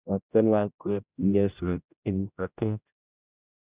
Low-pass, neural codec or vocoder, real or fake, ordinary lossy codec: 3.6 kHz; codec, 16 kHz, 0.5 kbps, X-Codec, HuBERT features, trained on general audio; fake; Opus, 64 kbps